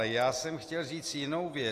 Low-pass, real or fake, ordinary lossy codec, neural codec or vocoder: 14.4 kHz; real; AAC, 48 kbps; none